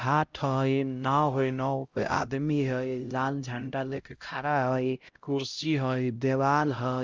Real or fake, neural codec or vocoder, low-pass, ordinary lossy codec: fake; codec, 16 kHz, 0.5 kbps, X-Codec, HuBERT features, trained on LibriSpeech; 7.2 kHz; Opus, 32 kbps